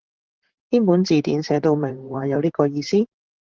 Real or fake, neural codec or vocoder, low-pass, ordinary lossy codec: fake; vocoder, 44.1 kHz, 128 mel bands, Pupu-Vocoder; 7.2 kHz; Opus, 16 kbps